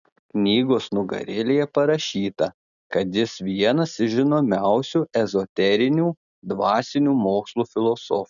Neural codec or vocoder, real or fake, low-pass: none; real; 7.2 kHz